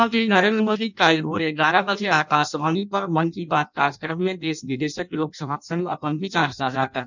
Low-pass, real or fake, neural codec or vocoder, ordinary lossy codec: 7.2 kHz; fake; codec, 16 kHz in and 24 kHz out, 0.6 kbps, FireRedTTS-2 codec; none